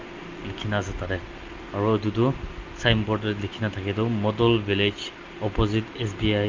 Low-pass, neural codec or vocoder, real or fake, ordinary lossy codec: 7.2 kHz; none; real; Opus, 24 kbps